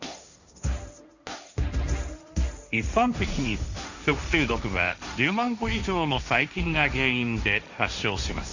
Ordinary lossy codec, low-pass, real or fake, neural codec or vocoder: none; 7.2 kHz; fake; codec, 16 kHz, 1.1 kbps, Voila-Tokenizer